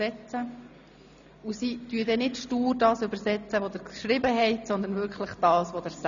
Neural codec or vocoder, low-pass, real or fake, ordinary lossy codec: none; 7.2 kHz; real; MP3, 96 kbps